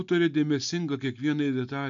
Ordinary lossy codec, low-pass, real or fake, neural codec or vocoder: AAC, 64 kbps; 7.2 kHz; real; none